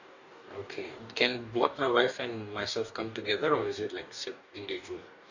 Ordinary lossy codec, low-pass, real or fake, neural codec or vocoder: none; 7.2 kHz; fake; codec, 44.1 kHz, 2.6 kbps, DAC